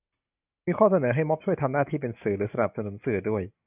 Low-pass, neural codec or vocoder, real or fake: 3.6 kHz; none; real